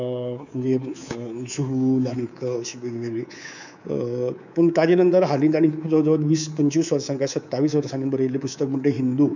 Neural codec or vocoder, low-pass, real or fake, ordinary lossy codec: codec, 24 kHz, 3.1 kbps, DualCodec; 7.2 kHz; fake; none